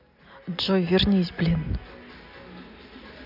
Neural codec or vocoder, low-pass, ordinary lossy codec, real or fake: none; 5.4 kHz; none; real